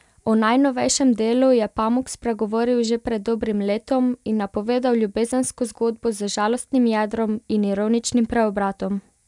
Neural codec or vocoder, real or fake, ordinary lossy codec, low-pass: none; real; none; 10.8 kHz